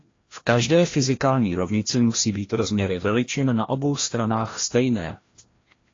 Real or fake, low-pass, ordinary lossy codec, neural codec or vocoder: fake; 7.2 kHz; AAC, 32 kbps; codec, 16 kHz, 1 kbps, FreqCodec, larger model